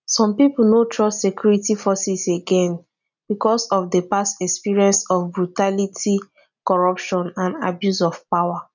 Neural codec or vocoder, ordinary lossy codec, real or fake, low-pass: none; none; real; 7.2 kHz